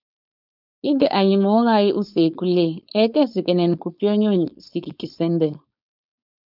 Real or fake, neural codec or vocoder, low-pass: fake; codec, 16 kHz, 4.8 kbps, FACodec; 5.4 kHz